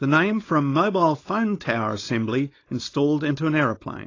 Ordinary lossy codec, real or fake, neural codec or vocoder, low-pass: AAC, 32 kbps; fake; codec, 16 kHz, 4.8 kbps, FACodec; 7.2 kHz